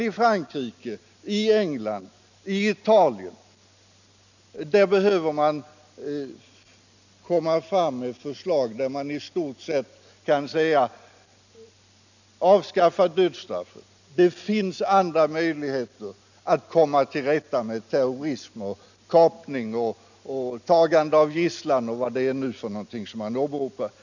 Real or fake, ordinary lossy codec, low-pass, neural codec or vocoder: real; none; 7.2 kHz; none